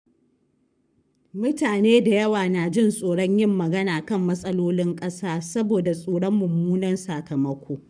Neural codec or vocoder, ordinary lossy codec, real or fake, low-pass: codec, 44.1 kHz, 7.8 kbps, Pupu-Codec; none; fake; 9.9 kHz